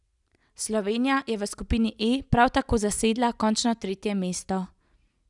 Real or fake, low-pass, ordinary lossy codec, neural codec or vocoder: real; 10.8 kHz; none; none